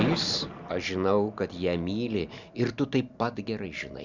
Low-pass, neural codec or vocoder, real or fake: 7.2 kHz; none; real